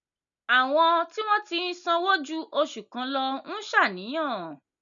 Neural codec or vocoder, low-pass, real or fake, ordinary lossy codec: none; 7.2 kHz; real; none